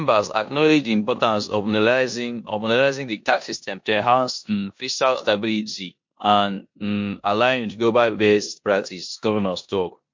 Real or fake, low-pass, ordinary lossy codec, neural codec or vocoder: fake; 7.2 kHz; MP3, 48 kbps; codec, 16 kHz in and 24 kHz out, 0.9 kbps, LongCat-Audio-Codec, four codebook decoder